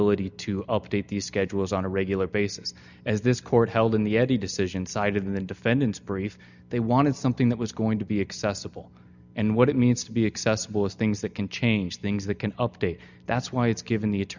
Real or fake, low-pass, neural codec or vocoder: real; 7.2 kHz; none